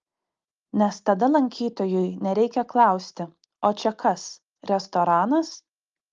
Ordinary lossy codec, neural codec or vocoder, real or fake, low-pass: Opus, 32 kbps; none; real; 7.2 kHz